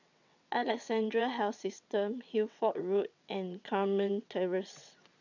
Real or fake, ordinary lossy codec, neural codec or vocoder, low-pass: fake; none; vocoder, 22.05 kHz, 80 mel bands, WaveNeXt; 7.2 kHz